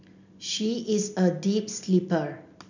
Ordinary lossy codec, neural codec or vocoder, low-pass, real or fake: none; none; 7.2 kHz; real